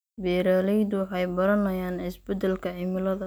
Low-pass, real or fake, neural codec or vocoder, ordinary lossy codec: none; real; none; none